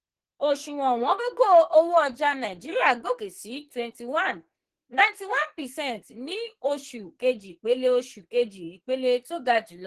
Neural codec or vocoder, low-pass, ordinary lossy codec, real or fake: codec, 44.1 kHz, 2.6 kbps, SNAC; 14.4 kHz; Opus, 16 kbps; fake